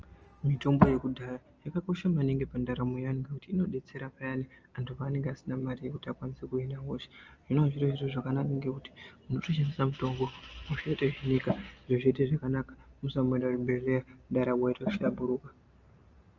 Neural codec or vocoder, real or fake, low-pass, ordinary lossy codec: none; real; 7.2 kHz; Opus, 24 kbps